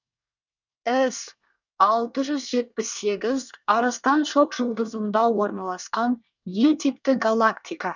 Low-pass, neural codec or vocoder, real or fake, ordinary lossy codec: 7.2 kHz; codec, 24 kHz, 1 kbps, SNAC; fake; none